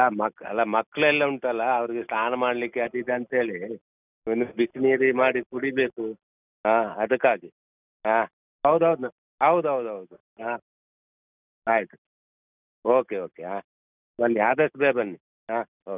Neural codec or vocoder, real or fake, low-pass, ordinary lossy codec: none; real; 3.6 kHz; none